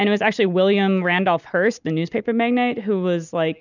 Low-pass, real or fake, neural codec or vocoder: 7.2 kHz; real; none